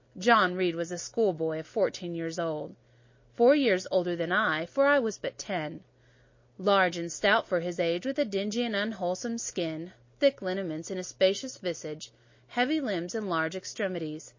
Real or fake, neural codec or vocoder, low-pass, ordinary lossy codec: real; none; 7.2 kHz; MP3, 32 kbps